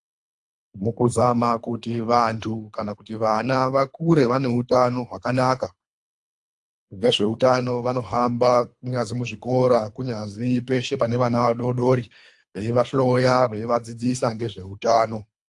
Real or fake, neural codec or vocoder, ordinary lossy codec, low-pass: fake; codec, 24 kHz, 3 kbps, HILCodec; AAC, 64 kbps; 10.8 kHz